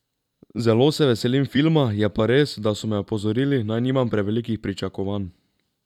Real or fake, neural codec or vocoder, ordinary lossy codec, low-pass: fake; vocoder, 44.1 kHz, 128 mel bands every 256 samples, BigVGAN v2; none; 19.8 kHz